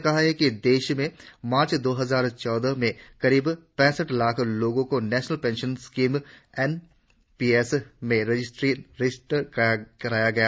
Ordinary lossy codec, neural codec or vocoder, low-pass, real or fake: none; none; none; real